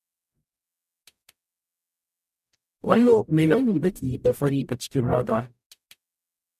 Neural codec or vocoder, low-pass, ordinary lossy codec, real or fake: codec, 44.1 kHz, 0.9 kbps, DAC; 14.4 kHz; none; fake